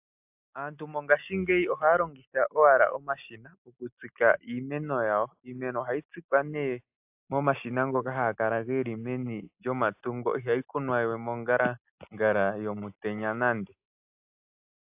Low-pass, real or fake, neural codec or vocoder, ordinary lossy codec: 3.6 kHz; real; none; AAC, 32 kbps